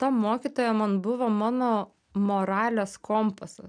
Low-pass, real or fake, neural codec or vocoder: 9.9 kHz; real; none